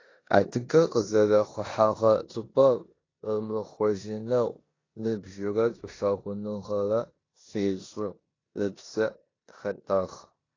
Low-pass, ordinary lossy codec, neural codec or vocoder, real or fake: 7.2 kHz; AAC, 32 kbps; codec, 16 kHz in and 24 kHz out, 0.9 kbps, LongCat-Audio-Codec, four codebook decoder; fake